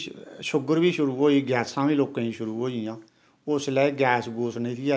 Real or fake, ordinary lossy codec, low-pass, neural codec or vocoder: real; none; none; none